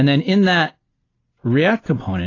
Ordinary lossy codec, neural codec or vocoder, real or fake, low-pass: AAC, 32 kbps; none; real; 7.2 kHz